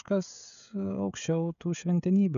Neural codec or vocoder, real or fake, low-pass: codec, 16 kHz, 16 kbps, FreqCodec, smaller model; fake; 7.2 kHz